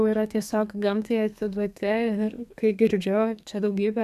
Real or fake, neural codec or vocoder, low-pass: fake; codec, 32 kHz, 1.9 kbps, SNAC; 14.4 kHz